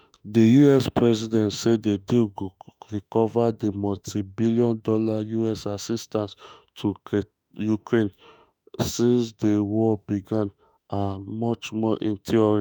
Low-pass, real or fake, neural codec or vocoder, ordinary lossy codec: none; fake; autoencoder, 48 kHz, 32 numbers a frame, DAC-VAE, trained on Japanese speech; none